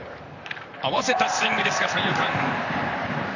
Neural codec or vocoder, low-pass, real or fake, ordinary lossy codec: vocoder, 44.1 kHz, 128 mel bands, Pupu-Vocoder; 7.2 kHz; fake; none